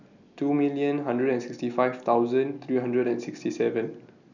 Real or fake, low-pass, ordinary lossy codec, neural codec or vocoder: real; 7.2 kHz; none; none